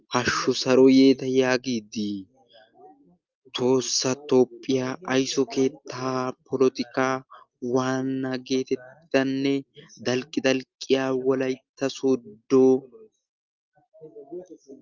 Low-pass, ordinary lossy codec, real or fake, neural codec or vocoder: 7.2 kHz; Opus, 24 kbps; real; none